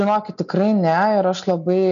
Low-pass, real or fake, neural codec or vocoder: 7.2 kHz; real; none